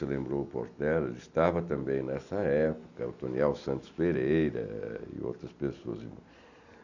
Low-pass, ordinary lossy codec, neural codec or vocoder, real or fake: 7.2 kHz; none; none; real